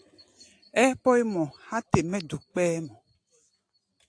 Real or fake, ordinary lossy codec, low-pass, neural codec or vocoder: real; AAC, 48 kbps; 9.9 kHz; none